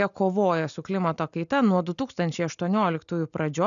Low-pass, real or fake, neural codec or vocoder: 7.2 kHz; real; none